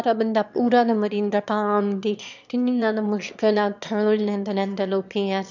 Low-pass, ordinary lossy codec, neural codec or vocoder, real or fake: 7.2 kHz; none; autoencoder, 22.05 kHz, a latent of 192 numbers a frame, VITS, trained on one speaker; fake